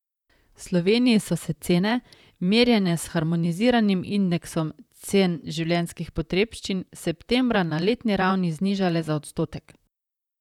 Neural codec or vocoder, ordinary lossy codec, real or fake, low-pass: vocoder, 44.1 kHz, 128 mel bands, Pupu-Vocoder; none; fake; 19.8 kHz